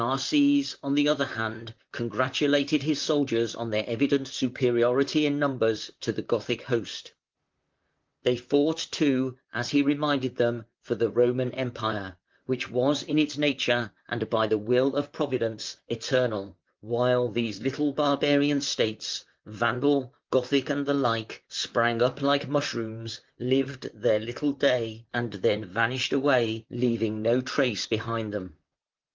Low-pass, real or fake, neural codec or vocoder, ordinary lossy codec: 7.2 kHz; fake; vocoder, 44.1 kHz, 128 mel bands, Pupu-Vocoder; Opus, 32 kbps